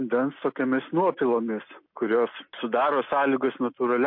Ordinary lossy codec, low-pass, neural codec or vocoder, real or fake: MP3, 32 kbps; 5.4 kHz; none; real